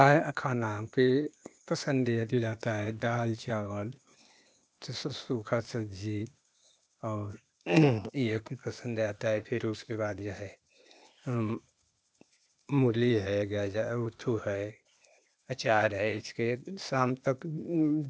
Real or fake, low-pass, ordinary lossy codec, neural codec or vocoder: fake; none; none; codec, 16 kHz, 0.8 kbps, ZipCodec